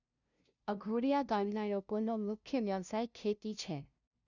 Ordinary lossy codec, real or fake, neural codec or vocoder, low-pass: none; fake; codec, 16 kHz, 0.5 kbps, FunCodec, trained on LibriTTS, 25 frames a second; 7.2 kHz